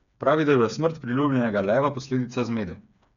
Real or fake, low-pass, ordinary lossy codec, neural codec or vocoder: fake; 7.2 kHz; none; codec, 16 kHz, 4 kbps, FreqCodec, smaller model